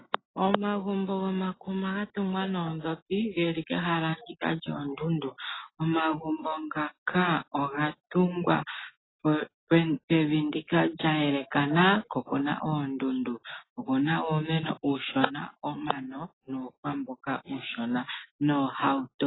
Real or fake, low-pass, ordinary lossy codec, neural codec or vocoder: real; 7.2 kHz; AAC, 16 kbps; none